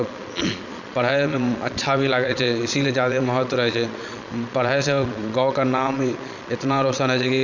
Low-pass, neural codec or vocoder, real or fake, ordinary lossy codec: 7.2 kHz; vocoder, 22.05 kHz, 80 mel bands, Vocos; fake; none